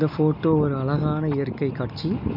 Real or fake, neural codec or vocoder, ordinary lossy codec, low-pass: real; none; none; 5.4 kHz